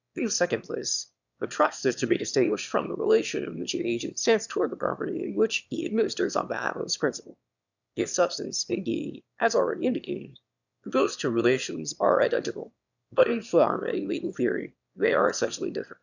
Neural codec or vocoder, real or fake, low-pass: autoencoder, 22.05 kHz, a latent of 192 numbers a frame, VITS, trained on one speaker; fake; 7.2 kHz